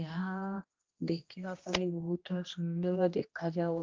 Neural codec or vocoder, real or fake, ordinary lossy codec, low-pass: codec, 16 kHz, 1 kbps, X-Codec, HuBERT features, trained on general audio; fake; Opus, 24 kbps; 7.2 kHz